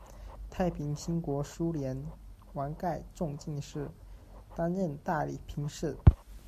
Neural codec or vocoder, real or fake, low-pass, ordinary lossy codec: none; real; 14.4 kHz; MP3, 64 kbps